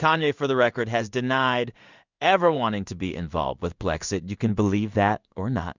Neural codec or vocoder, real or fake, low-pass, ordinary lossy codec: codec, 16 kHz in and 24 kHz out, 1 kbps, XY-Tokenizer; fake; 7.2 kHz; Opus, 64 kbps